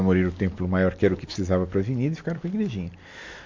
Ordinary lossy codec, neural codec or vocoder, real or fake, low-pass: MP3, 48 kbps; none; real; 7.2 kHz